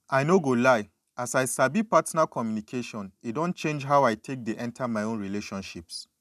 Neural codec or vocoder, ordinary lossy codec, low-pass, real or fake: none; none; 14.4 kHz; real